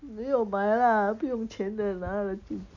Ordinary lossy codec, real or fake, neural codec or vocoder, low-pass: none; real; none; 7.2 kHz